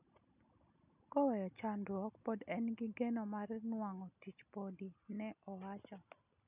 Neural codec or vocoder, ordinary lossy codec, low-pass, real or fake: none; none; 3.6 kHz; real